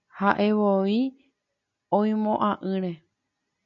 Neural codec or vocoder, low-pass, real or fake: none; 7.2 kHz; real